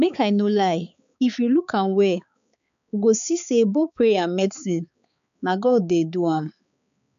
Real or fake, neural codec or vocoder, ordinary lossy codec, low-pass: fake; codec, 16 kHz, 4 kbps, X-Codec, HuBERT features, trained on balanced general audio; MP3, 64 kbps; 7.2 kHz